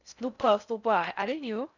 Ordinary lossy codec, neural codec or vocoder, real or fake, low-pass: none; codec, 16 kHz in and 24 kHz out, 0.6 kbps, FocalCodec, streaming, 4096 codes; fake; 7.2 kHz